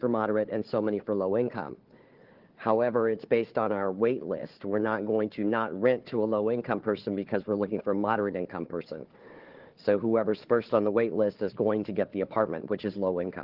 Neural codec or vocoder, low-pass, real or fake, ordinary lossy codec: codec, 16 kHz, 4 kbps, FunCodec, trained on Chinese and English, 50 frames a second; 5.4 kHz; fake; Opus, 16 kbps